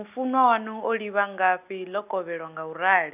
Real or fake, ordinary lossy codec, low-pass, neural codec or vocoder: real; none; 3.6 kHz; none